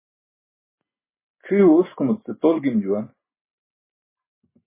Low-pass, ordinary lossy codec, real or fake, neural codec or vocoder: 3.6 kHz; MP3, 16 kbps; real; none